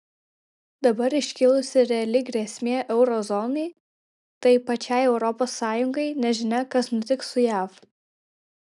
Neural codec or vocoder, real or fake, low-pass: none; real; 10.8 kHz